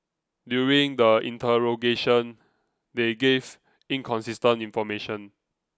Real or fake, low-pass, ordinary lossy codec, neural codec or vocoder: real; none; none; none